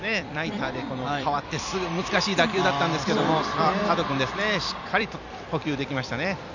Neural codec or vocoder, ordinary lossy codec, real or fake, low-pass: vocoder, 44.1 kHz, 128 mel bands every 256 samples, BigVGAN v2; none; fake; 7.2 kHz